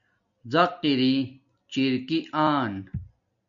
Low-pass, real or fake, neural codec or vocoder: 7.2 kHz; real; none